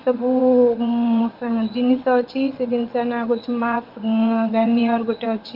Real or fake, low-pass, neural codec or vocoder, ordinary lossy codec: fake; 5.4 kHz; vocoder, 22.05 kHz, 80 mel bands, WaveNeXt; Opus, 32 kbps